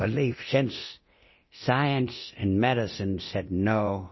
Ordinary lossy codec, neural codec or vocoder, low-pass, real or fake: MP3, 24 kbps; codec, 24 kHz, 0.9 kbps, DualCodec; 7.2 kHz; fake